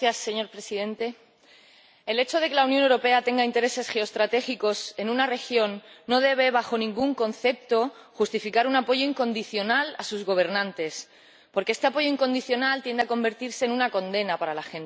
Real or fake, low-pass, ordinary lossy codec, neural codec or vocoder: real; none; none; none